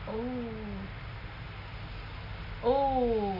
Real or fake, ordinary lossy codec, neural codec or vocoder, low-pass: real; none; none; 5.4 kHz